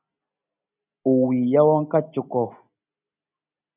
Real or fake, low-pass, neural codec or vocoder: real; 3.6 kHz; none